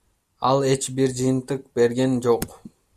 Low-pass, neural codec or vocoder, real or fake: 14.4 kHz; none; real